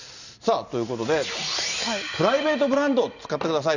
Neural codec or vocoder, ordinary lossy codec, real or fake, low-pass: none; none; real; 7.2 kHz